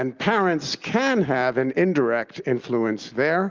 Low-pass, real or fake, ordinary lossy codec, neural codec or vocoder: 7.2 kHz; real; Opus, 32 kbps; none